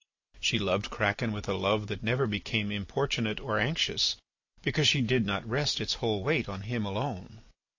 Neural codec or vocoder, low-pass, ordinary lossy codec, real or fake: none; 7.2 kHz; AAC, 48 kbps; real